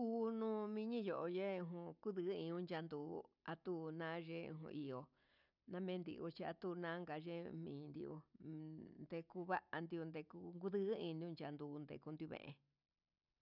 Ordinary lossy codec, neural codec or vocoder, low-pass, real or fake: none; none; 5.4 kHz; real